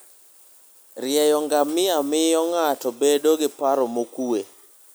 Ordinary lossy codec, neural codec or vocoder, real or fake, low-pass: none; none; real; none